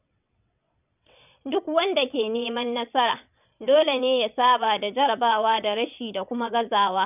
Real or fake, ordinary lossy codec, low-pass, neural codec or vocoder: fake; none; 3.6 kHz; vocoder, 22.05 kHz, 80 mel bands, WaveNeXt